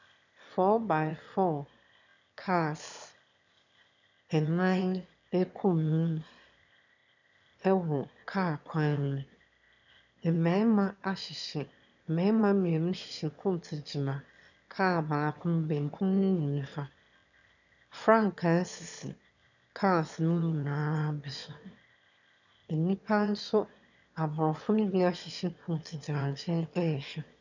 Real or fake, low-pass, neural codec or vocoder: fake; 7.2 kHz; autoencoder, 22.05 kHz, a latent of 192 numbers a frame, VITS, trained on one speaker